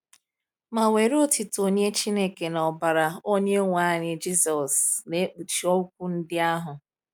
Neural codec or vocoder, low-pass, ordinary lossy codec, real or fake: none; none; none; real